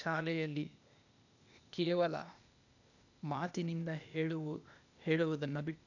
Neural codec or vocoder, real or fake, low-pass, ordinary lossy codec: codec, 16 kHz, 0.8 kbps, ZipCodec; fake; 7.2 kHz; none